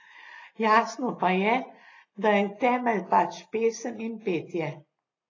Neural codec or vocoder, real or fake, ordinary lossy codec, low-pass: none; real; AAC, 32 kbps; 7.2 kHz